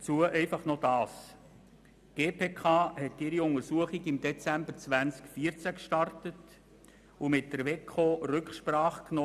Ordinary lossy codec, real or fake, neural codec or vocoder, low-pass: none; real; none; 14.4 kHz